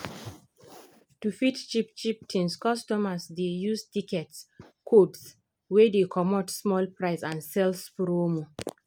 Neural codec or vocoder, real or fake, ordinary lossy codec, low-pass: none; real; none; none